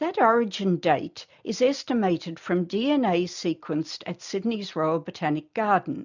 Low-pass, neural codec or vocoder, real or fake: 7.2 kHz; none; real